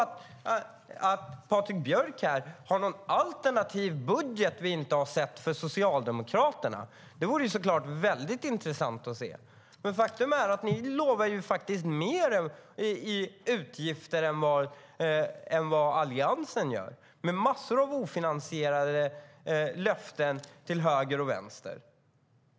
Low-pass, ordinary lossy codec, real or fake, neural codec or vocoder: none; none; real; none